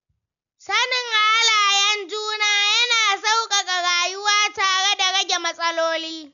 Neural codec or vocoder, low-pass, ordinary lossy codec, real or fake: none; 7.2 kHz; none; real